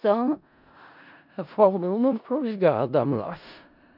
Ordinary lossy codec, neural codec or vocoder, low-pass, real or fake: none; codec, 16 kHz in and 24 kHz out, 0.4 kbps, LongCat-Audio-Codec, four codebook decoder; 5.4 kHz; fake